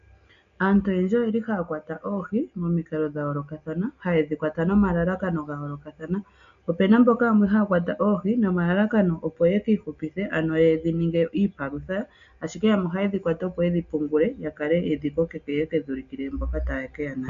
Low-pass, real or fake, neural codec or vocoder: 7.2 kHz; real; none